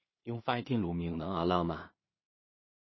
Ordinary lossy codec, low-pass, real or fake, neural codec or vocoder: MP3, 24 kbps; 7.2 kHz; fake; codec, 16 kHz in and 24 kHz out, 0.4 kbps, LongCat-Audio-Codec, two codebook decoder